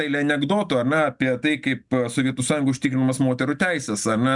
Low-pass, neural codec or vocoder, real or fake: 10.8 kHz; none; real